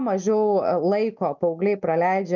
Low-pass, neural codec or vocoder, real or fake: 7.2 kHz; none; real